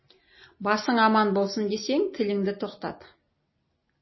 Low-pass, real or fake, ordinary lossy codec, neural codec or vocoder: 7.2 kHz; real; MP3, 24 kbps; none